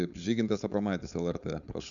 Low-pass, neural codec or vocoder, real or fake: 7.2 kHz; codec, 16 kHz, 4.8 kbps, FACodec; fake